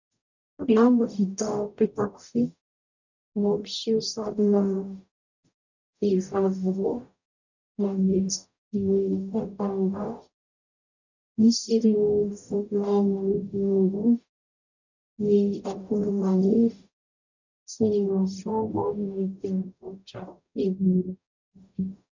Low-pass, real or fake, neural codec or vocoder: 7.2 kHz; fake; codec, 44.1 kHz, 0.9 kbps, DAC